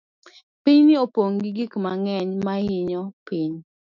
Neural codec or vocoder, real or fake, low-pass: autoencoder, 48 kHz, 128 numbers a frame, DAC-VAE, trained on Japanese speech; fake; 7.2 kHz